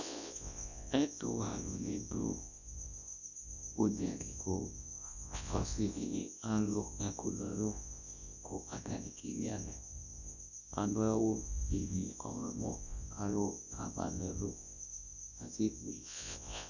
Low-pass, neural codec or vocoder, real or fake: 7.2 kHz; codec, 24 kHz, 0.9 kbps, WavTokenizer, large speech release; fake